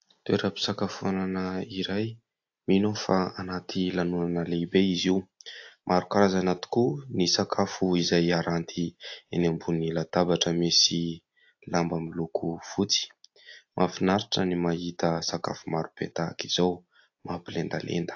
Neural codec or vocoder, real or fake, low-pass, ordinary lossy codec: none; real; 7.2 kHz; AAC, 48 kbps